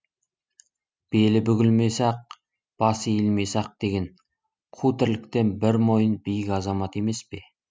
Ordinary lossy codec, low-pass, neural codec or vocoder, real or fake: none; none; none; real